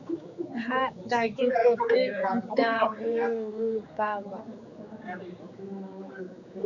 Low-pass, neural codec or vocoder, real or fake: 7.2 kHz; codec, 16 kHz, 4 kbps, X-Codec, HuBERT features, trained on general audio; fake